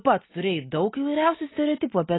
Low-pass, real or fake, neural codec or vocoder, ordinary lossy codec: 7.2 kHz; real; none; AAC, 16 kbps